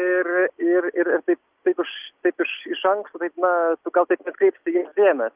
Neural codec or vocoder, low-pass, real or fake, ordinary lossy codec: none; 3.6 kHz; real; Opus, 24 kbps